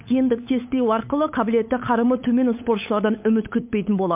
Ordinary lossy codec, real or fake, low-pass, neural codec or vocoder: MP3, 32 kbps; fake; 3.6 kHz; codec, 16 kHz, 8 kbps, FunCodec, trained on LibriTTS, 25 frames a second